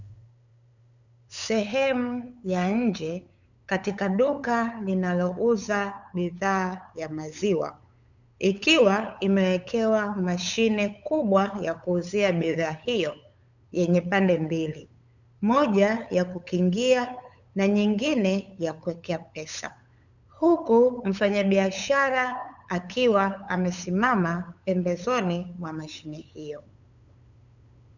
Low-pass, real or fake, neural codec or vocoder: 7.2 kHz; fake; codec, 16 kHz, 8 kbps, FunCodec, trained on LibriTTS, 25 frames a second